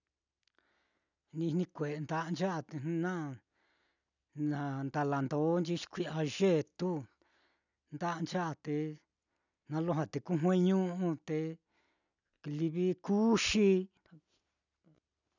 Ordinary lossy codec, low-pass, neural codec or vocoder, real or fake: none; 7.2 kHz; none; real